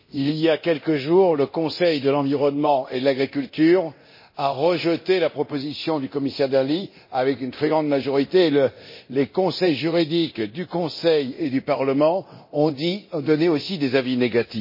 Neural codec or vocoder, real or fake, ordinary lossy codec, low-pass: codec, 24 kHz, 0.9 kbps, DualCodec; fake; MP3, 24 kbps; 5.4 kHz